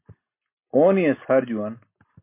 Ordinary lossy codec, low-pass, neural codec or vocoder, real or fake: MP3, 24 kbps; 3.6 kHz; none; real